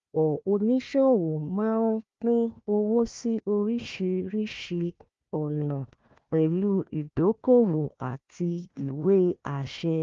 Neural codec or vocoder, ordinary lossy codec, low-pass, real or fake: codec, 16 kHz, 1 kbps, FunCodec, trained on Chinese and English, 50 frames a second; Opus, 32 kbps; 7.2 kHz; fake